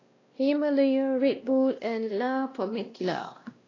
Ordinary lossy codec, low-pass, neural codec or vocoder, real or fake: AAC, 32 kbps; 7.2 kHz; codec, 16 kHz, 1 kbps, X-Codec, WavLM features, trained on Multilingual LibriSpeech; fake